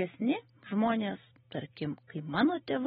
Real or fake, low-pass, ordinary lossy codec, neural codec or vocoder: real; 19.8 kHz; AAC, 16 kbps; none